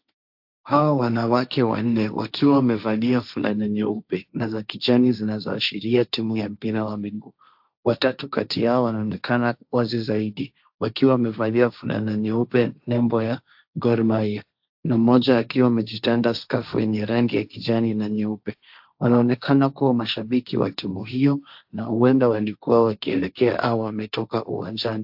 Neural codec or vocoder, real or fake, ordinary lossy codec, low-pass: codec, 16 kHz, 1.1 kbps, Voila-Tokenizer; fake; AAC, 48 kbps; 5.4 kHz